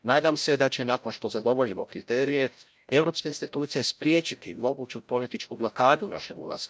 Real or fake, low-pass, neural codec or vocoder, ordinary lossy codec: fake; none; codec, 16 kHz, 0.5 kbps, FreqCodec, larger model; none